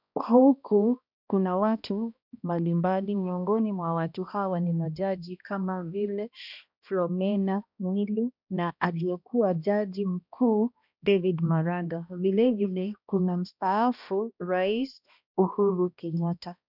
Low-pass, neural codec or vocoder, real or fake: 5.4 kHz; codec, 16 kHz, 1 kbps, X-Codec, HuBERT features, trained on balanced general audio; fake